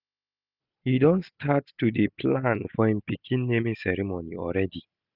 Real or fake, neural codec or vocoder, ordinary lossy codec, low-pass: real; none; none; 5.4 kHz